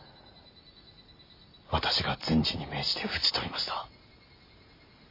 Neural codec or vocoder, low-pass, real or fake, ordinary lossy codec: none; 5.4 kHz; real; MP3, 24 kbps